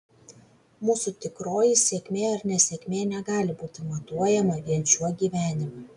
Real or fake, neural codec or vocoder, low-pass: real; none; 10.8 kHz